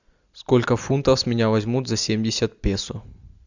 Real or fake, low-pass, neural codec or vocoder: real; 7.2 kHz; none